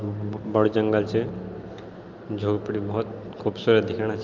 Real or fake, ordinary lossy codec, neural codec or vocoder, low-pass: real; Opus, 32 kbps; none; 7.2 kHz